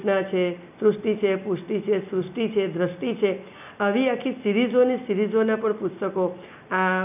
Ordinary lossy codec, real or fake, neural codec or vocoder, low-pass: none; real; none; 3.6 kHz